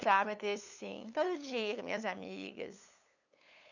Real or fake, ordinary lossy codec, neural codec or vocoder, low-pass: fake; none; codec, 16 kHz, 8 kbps, FunCodec, trained on LibriTTS, 25 frames a second; 7.2 kHz